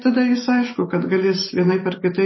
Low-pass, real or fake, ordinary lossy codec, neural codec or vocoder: 7.2 kHz; real; MP3, 24 kbps; none